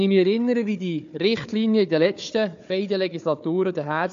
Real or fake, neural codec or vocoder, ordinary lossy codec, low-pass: fake; codec, 16 kHz, 4 kbps, FunCodec, trained on Chinese and English, 50 frames a second; AAC, 64 kbps; 7.2 kHz